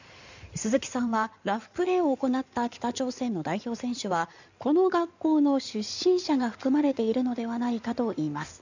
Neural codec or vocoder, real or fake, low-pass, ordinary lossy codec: codec, 16 kHz in and 24 kHz out, 2.2 kbps, FireRedTTS-2 codec; fake; 7.2 kHz; none